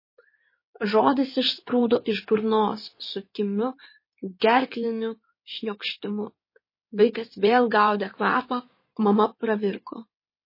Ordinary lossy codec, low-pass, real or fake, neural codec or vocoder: MP3, 24 kbps; 5.4 kHz; fake; codec, 16 kHz in and 24 kHz out, 2.2 kbps, FireRedTTS-2 codec